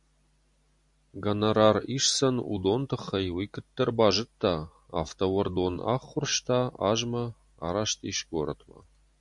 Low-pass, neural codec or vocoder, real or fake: 10.8 kHz; none; real